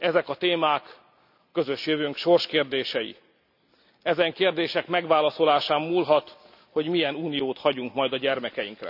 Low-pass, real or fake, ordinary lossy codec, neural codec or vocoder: 5.4 kHz; real; none; none